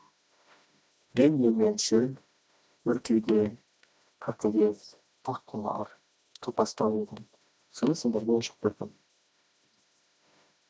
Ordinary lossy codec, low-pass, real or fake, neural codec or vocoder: none; none; fake; codec, 16 kHz, 1 kbps, FreqCodec, smaller model